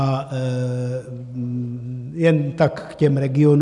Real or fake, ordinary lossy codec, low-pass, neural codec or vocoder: real; MP3, 96 kbps; 10.8 kHz; none